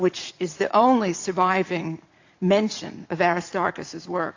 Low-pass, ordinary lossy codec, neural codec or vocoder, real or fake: 7.2 kHz; AAC, 48 kbps; vocoder, 44.1 kHz, 80 mel bands, Vocos; fake